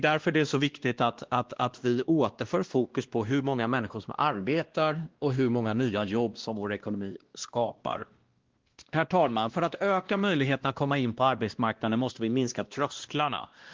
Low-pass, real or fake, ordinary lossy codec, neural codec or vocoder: 7.2 kHz; fake; Opus, 16 kbps; codec, 16 kHz, 1 kbps, X-Codec, WavLM features, trained on Multilingual LibriSpeech